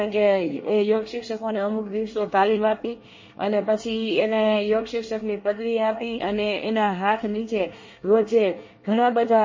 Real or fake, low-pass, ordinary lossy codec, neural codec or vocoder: fake; 7.2 kHz; MP3, 32 kbps; codec, 24 kHz, 1 kbps, SNAC